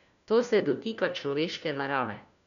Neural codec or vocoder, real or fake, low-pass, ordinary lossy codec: codec, 16 kHz, 1 kbps, FunCodec, trained on LibriTTS, 50 frames a second; fake; 7.2 kHz; none